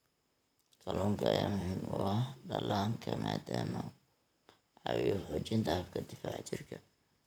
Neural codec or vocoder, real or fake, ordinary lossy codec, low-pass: vocoder, 44.1 kHz, 128 mel bands, Pupu-Vocoder; fake; none; none